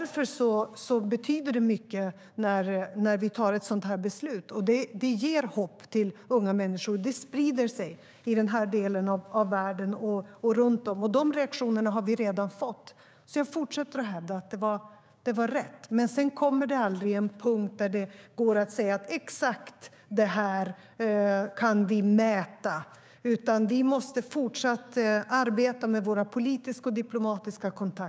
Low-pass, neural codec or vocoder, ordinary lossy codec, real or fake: none; codec, 16 kHz, 6 kbps, DAC; none; fake